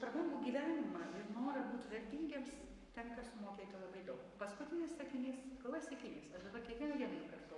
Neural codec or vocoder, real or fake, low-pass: codec, 44.1 kHz, 7.8 kbps, Pupu-Codec; fake; 10.8 kHz